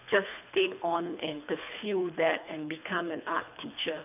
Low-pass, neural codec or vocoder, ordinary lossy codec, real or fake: 3.6 kHz; codec, 24 kHz, 3 kbps, HILCodec; none; fake